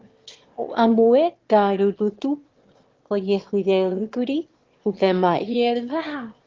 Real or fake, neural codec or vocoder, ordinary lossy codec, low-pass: fake; autoencoder, 22.05 kHz, a latent of 192 numbers a frame, VITS, trained on one speaker; Opus, 16 kbps; 7.2 kHz